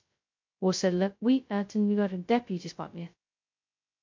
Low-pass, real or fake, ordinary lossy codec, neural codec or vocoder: 7.2 kHz; fake; MP3, 48 kbps; codec, 16 kHz, 0.2 kbps, FocalCodec